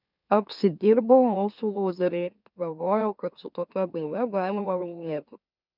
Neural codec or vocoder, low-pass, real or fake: autoencoder, 44.1 kHz, a latent of 192 numbers a frame, MeloTTS; 5.4 kHz; fake